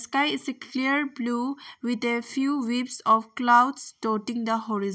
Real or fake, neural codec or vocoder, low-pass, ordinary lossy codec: real; none; none; none